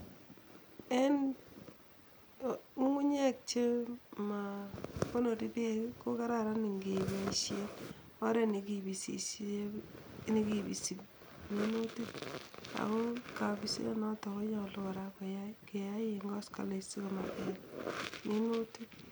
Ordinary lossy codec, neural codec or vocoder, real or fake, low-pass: none; none; real; none